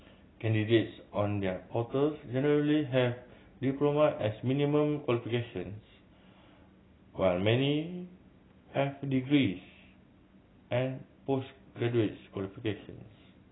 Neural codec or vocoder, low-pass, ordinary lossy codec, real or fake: none; 7.2 kHz; AAC, 16 kbps; real